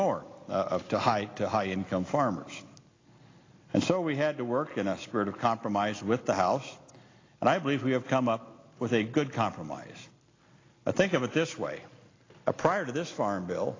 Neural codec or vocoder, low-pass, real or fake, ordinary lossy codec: none; 7.2 kHz; real; AAC, 32 kbps